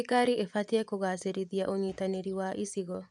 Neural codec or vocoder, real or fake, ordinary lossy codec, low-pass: vocoder, 24 kHz, 100 mel bands, Vocos; fake; none; 10.8 kHz